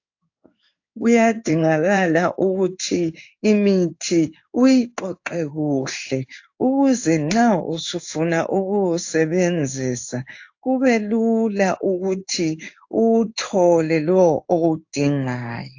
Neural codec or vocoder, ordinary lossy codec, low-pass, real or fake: codec, 16 kHz in and 24 kHz out, 1 kbps, XY-Tokenizer; AAC, 48 kbps; 7.2 kHz; fake